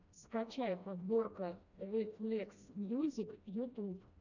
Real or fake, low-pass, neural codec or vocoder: fake; 7.2 kHz; codec, 16 kHz, 1 kbps, FreqCodec, smaller model